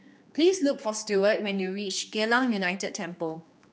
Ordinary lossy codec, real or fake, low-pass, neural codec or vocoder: none; fake; none; codec, 16 kHz, 2 kbps, X-Codec, HuBERT features, trained on general audio